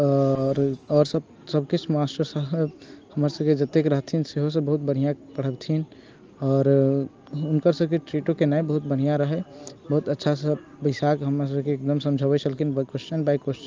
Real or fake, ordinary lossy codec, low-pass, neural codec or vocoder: real; Opus, 24 kbps; 7.2 kHz; none